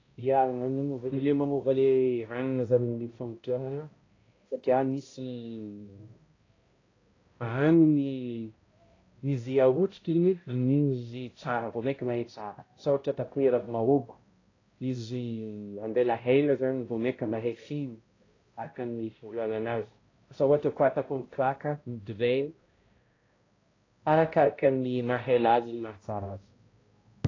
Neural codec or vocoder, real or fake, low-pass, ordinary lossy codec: codec, 16 kHz, 0.5 kbps, X-Codec, HuBERT features, trained on balanced general audio; fake; 7.2 kHz; AAC, 32 kbps